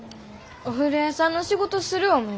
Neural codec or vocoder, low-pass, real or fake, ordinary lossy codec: none; none; real; none